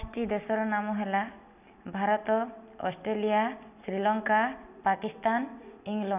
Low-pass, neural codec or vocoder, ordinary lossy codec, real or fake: 3.6 kHz; none; none; real